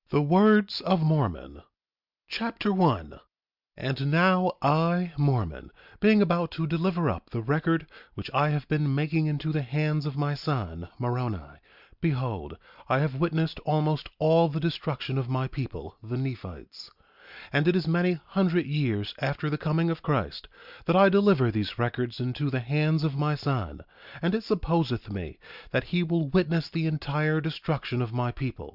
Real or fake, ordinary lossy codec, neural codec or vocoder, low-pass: real; Opus, 64 kbps; none; 5.4 kHz